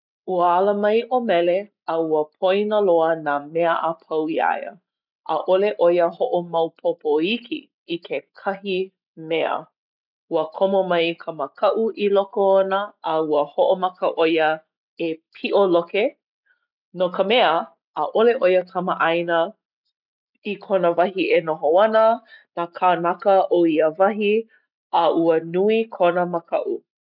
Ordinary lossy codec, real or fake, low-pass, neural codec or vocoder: none; real; 5.4 kHz; none